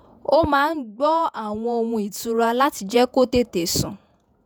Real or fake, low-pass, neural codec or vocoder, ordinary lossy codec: fake; none; vocoder, 48 kHz, 128 mel bands, Vocos; none